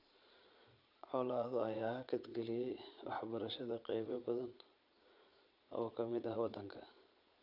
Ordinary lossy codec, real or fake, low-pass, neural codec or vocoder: none; fake; 5.4 kHz; vocoder, 22.05 kHz, 80 mel bands, Vocos